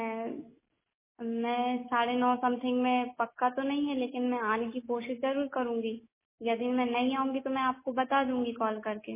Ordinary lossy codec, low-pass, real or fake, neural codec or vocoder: MP3, 16 kbps; 3.6 kHz; real; none